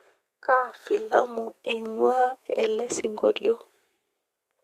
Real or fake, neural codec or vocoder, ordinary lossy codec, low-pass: fake; codec, 32 kHz, 1.9 kbps, SNAC; Opus, 64 kbps; 14.4 kHz